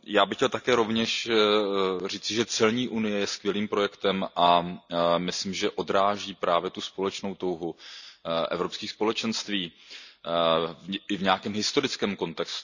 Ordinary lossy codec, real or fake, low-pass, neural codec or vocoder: none; real; 7.2 kHz; none